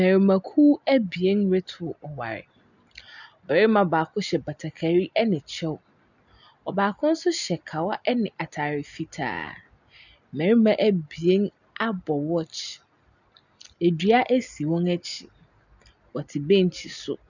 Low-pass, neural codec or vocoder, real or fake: 7.2 kHz; none; real